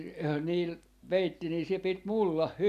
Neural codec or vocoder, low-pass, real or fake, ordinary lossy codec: vocoder, 44.1 kHz, 128 mel bands every 256 samples, BigVGAN v2; 14.4 kHz; fake; none